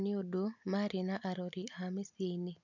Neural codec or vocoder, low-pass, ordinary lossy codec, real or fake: none; 7.2 kHz; none; real